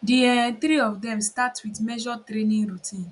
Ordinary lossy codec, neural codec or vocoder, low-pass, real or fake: none; none; 10.8 kHz; real